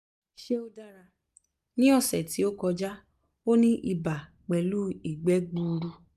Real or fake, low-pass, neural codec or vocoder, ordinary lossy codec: real; 14.4 kHz; none; none